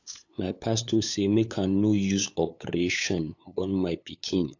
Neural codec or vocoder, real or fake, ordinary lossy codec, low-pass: codec, 16 kHz, 8 kbps, FunCodec, trained on LibriTTS, 25 frames a second; fake; AAC, 48 kbps; 7.2 kHz